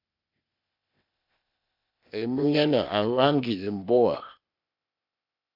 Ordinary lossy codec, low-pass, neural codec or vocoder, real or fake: MP3, 48 kbps; 5.4 kHz; codec, 16 kHz, 0.8 kbps, ZipCodec; fake